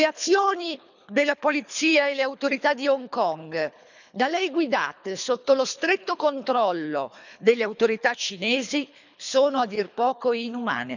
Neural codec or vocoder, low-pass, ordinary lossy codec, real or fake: codec, 24 kHz, 3 kbps, HILCodec; 7.2 kHz; none; fake